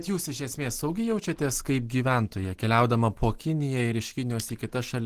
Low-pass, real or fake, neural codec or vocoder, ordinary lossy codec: 14.4 kHz; real; none; Opus, 16 kbps